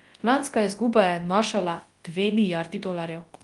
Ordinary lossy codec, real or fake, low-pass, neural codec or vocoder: Opus, 24 kbps; fake; 10.8 kHz; codec, 24 kHz, 0.9 kbps, WavTokenizer, large speech release